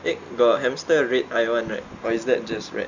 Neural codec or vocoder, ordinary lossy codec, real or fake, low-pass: none; none; real; 7.2 kHz